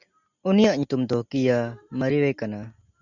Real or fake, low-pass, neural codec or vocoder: real; 7.2 kHz; none